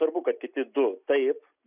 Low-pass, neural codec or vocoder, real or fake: 3.6 kHz; none; real